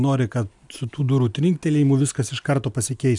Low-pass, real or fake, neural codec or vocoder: 10.8 kHz; real; none